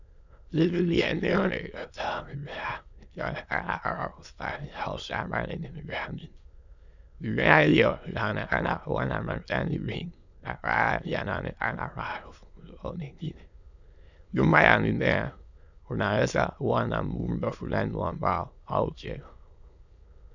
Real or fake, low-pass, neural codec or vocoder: fake; 7.2 kHz; autoencoder, 22.05 kHz, a latent of 192 numbers a frame, VITS, trained on many speakers